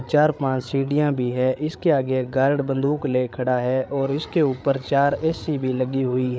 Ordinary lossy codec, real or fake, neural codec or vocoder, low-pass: none; fake; codec, 16 kHz, 8 kbps, FreqCodec, larger model; none